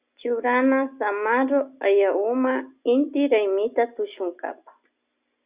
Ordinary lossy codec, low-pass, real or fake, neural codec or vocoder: Opus, 64 kbps; 3.6 kHz; real; none